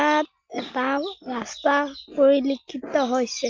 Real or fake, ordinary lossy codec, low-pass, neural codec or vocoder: real; Opus, 32 kbps; 7.2 kHz; none